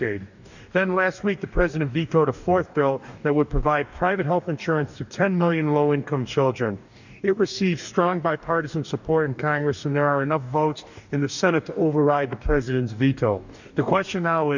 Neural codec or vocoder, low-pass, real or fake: codec, 44.1 kHz, 2.6 kbps, DAC; 7.2 kHz; fake